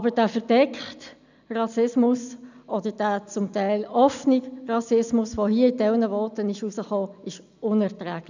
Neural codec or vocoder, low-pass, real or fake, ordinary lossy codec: none; 7.2 kHz; real; none